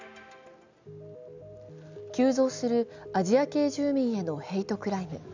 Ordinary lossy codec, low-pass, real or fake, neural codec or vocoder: none; 7.2 kHz; real; none